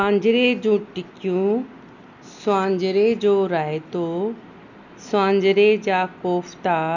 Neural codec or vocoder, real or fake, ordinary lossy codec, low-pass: none; real; none; 7.2 kHz